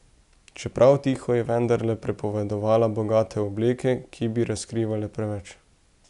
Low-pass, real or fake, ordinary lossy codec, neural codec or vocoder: 10.8 kHz; real; none; none